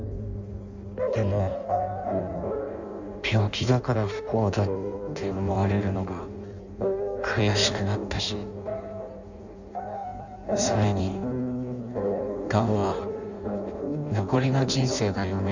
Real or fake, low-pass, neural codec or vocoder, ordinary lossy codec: fake; 7.2 kHz; codec, 16 kHz in and 24 kHz out, 0.6 kbps, FireRedTTS-2 codec; none